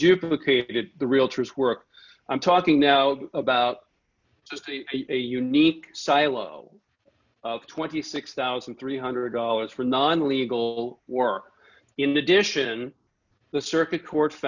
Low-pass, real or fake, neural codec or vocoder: 7.2 kHz; real; none